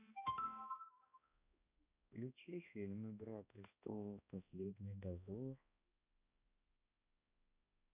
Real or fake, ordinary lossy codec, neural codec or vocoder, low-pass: fake; none; codec, 16 kHz, 1 kbps, X-Codec, HuBERT features, trained on balanced general audio; 3.6 kHz